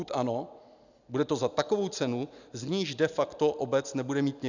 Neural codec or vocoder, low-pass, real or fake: none; 7.2 kHz; real